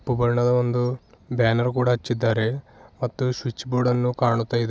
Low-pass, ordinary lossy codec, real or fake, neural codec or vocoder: none; none; real; none